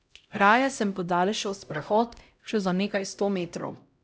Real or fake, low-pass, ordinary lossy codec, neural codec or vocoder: fake; none; none; codec, 16 kHz, 0.5 kbps, X-Codec, HuBERT features, trained on LibriSpeech